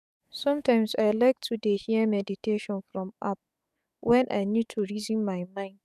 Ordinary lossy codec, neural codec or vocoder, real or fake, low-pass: none; autoencoder, 48 kHz, 128 numbers a frame, DAC-VAE, trained on Japanese speech; fake; 14.4 kHz